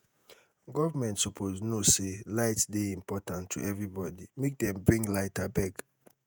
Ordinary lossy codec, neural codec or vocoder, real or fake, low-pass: none; vocoder, 48 kHz, 128 mel bands, Vocos; fake; none